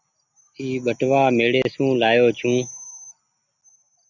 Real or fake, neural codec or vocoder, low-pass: real; none; 7.2 kHz